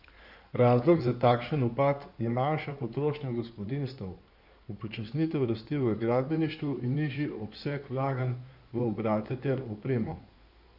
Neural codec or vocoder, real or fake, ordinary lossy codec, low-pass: codec, 16 kHz in and 24 kHz out, 2.2 kbps, FireRedTTS-2 codec; fake; none; 5.4 kHz